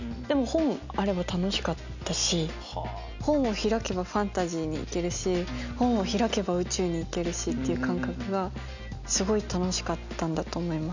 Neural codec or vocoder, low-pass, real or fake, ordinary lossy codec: none; 7.2 kHz; real; none